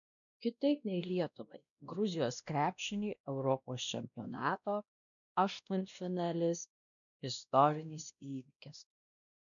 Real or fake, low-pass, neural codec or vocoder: fake; 7.2 kHz; codec, 16 kHz, 1 kbps, X-Codec, WavLM features, trained on Multilingual LibriSpeech